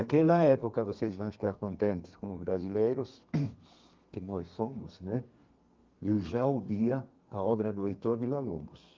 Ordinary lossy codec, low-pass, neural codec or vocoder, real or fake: Opus, 32 kbps; 7.2 kHz; codec, 32 kHz, 1.9 kbps, SNAC; fake